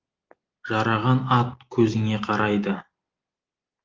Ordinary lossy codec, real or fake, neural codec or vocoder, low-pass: Opus, 32 kbps; real; none; 7.2 kHz